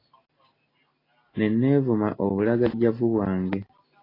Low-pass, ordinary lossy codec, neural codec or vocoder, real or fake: 5.4 kHz; AAC, 24 kbps; none; real